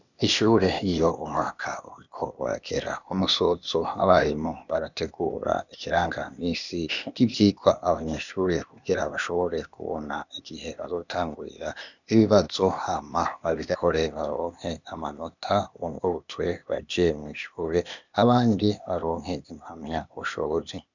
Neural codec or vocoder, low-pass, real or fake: codec, 16 kHz, 0.8 kbps, ZipCodec; 7.2 kHz; fake